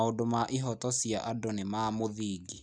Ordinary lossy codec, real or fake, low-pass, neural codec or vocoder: none; real; 9.9 kHz; none